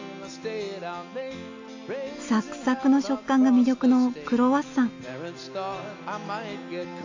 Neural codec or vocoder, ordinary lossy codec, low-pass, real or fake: none; AAC, 48 kbps; 7.2 kHz; real